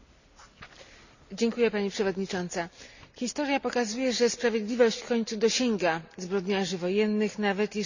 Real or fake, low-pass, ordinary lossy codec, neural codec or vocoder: real; 7.2 kHz; none; none